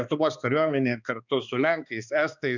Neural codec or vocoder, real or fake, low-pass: codec, 16 kHz, 2 kbps, X-Codec, HuBERT features, trained on balanced general audio; fake; 7.2 kHz